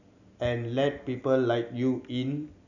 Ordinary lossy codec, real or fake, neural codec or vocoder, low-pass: none; real; none; 7.2 kHz